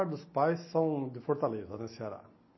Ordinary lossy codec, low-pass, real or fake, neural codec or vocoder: MP3, 24 kbps; 7.2 kHz; real; none